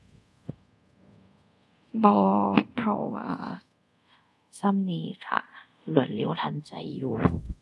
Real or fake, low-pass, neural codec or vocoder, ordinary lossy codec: fake; none; codec, 24 kHz, 0.5 kbps, DualCodec; none